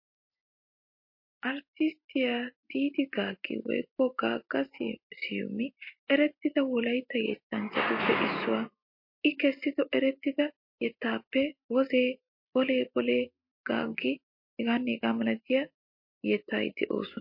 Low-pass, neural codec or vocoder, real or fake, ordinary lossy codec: 5.4 kHz; none; real; MP3, 32 kbps